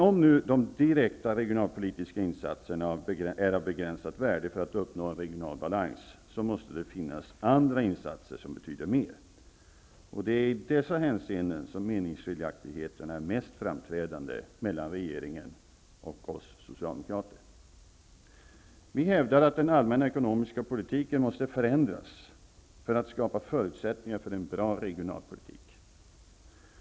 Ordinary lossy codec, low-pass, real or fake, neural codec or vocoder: none; none; real; none